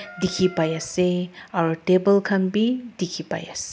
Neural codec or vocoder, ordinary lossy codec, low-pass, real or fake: none; none; none; real